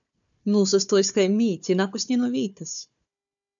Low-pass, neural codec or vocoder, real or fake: 7.2 kHz; codec, 16 kHz, 4 kbps, FunCodec, trained on Chinese and English, 50 frames a second; fake